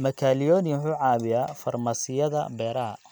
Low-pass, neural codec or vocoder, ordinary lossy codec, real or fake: none; none; none; real